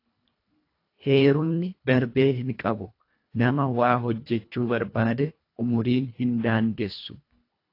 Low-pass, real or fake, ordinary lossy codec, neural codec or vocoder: 5.4 kHz; fake; AAC, 32 kbps; codec, 24 kHz, 1.5 kbps, HILCodec